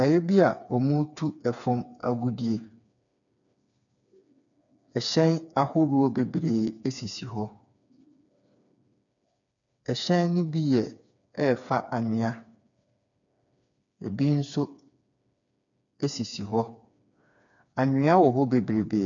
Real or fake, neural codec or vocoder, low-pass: fake; codec, 16 kHz, 4 kbps, FreqCodec, smaller model; 7.2 kHz